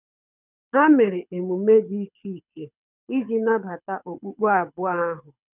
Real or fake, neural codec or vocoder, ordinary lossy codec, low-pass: fake; codec, 24 kHz, 6 kbps, HILCodec; none; 3.6 kHz